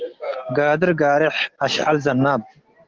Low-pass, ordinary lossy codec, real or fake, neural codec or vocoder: 7.2 kHz; Opus, 16 kbps; real; none